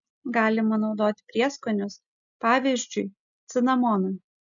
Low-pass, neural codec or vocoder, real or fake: 7.2 kHz; none; real